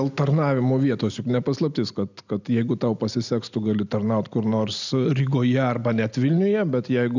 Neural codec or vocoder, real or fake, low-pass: none; real; 7.2 kHz